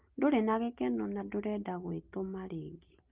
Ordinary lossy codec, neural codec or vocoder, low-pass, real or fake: Opus, 32 kbps; none; 3.6 kHz; real